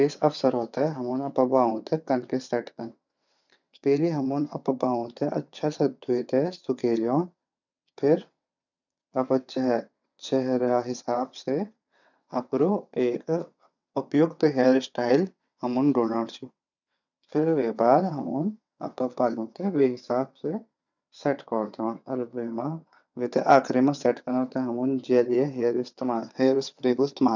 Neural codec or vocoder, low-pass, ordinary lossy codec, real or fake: vocoder, 22.05 kHz, 80 mel bands, WaveNeXt; 7.2 kHz; none; fake